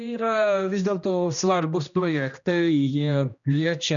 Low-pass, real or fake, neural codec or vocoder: 7.2 kHz; fake; codec, 16 kHz, 1 kbps, X-Codec, HuBERT features, trained on general audio